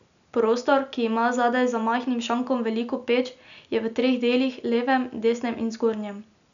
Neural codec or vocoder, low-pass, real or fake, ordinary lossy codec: none; 7.2 kHz; real; MP3, 96 kbps